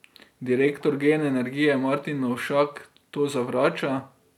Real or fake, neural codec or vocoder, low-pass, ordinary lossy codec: real; none; 19.8 kHz; none